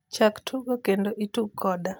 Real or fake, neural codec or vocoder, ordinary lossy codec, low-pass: real; none; none; none